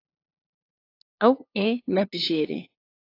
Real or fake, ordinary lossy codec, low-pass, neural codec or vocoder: fake; AAC, 32 kbps; 5.4 kHz; codec, 16 kHz, 2 kbps, FunCodec, trained on LibriTTS, 25 frames a second